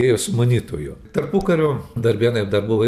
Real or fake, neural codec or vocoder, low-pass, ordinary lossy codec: fake; vocoder, 44.1 kHz, 128 mel bands every 512 samples, BigVGAN v2; 14.4 kHz; MP3, 96 kbps